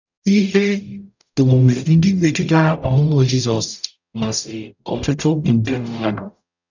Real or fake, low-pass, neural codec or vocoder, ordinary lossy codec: fake; 7.2 kHz; codec, 44.1 kHz, 0.9 kbps, DAC; none